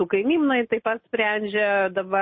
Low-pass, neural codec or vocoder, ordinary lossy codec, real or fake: 7.2 kHz; none; MP3, 24 kbps; real